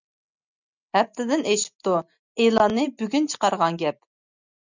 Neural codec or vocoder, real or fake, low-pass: none; real; 7.2 kHz